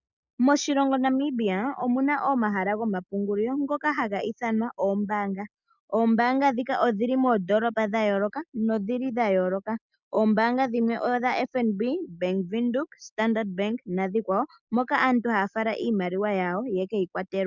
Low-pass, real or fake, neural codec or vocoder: 7.2 kHz; real; none